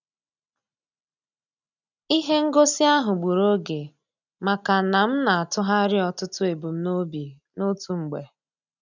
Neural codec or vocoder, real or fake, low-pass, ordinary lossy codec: none; real; 7.2 kHz; none